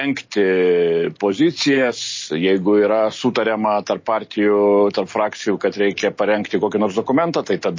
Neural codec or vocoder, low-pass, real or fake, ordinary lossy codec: none; 7.2 kHz; real; MP3, 32 kbps